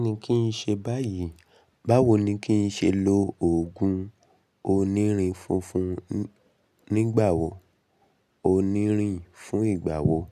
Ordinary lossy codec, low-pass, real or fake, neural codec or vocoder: none; 14.4 kHz; real; none